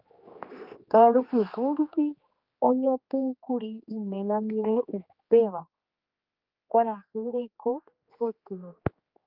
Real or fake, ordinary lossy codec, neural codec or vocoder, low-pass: fake; Opus, 24 kbps; codec, 16 kHz, 2 kbps, X-Codec, HuBERT features, trained on general audio; 5.4 kHz